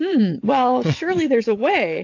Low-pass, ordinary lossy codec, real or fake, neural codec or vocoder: 7.2 kHz; AAC, 48 kbps; fake; vocoder, 22.05 kHz, 80 mel bands, WaveNeXt